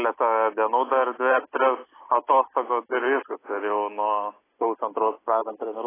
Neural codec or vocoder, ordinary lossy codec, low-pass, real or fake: none; AAC, 16 kbps; 3.6 kHz; real